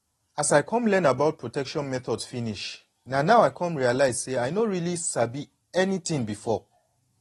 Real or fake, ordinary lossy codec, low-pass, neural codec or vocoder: real; AAC, 32 kbps; 14.4 kHz; none